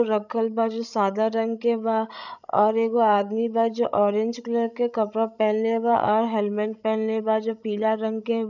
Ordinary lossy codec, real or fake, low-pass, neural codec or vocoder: none; fake; 7.2 kHz; codec, 16 kHz, 16 kbps, FreqCodec, larger model